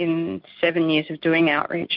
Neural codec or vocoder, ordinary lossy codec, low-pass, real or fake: none; MP3, 48 kbps; 5.4 kHz; real